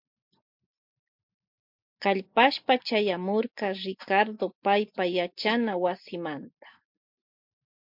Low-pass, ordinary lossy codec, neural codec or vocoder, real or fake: 5.4 kHz; MP3, 48 kbps; none; real